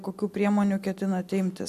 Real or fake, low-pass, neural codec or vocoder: real; 14.4 kHz; none